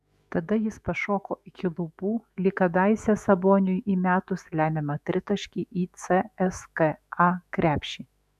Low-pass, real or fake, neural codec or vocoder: 14.4 kHz; fake; autoencoder, 48 kHz, 128 numbers a frame, DAC-VAE, trained on Japanese speech